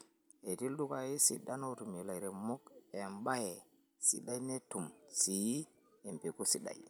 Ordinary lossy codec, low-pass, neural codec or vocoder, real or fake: none; none; none; real